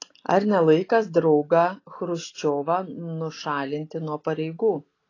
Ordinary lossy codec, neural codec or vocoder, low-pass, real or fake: AAC, 32 kbps; none; 7.2 kHz; real